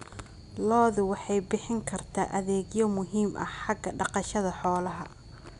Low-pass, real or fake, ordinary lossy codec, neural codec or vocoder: 10.8 kHz; real; none; none